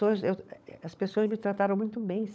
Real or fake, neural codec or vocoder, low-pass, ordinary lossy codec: fake; codec, 16 kHz, 16 kbps, FunCodec, trained on LibriTTS, 50 frames a second; none; none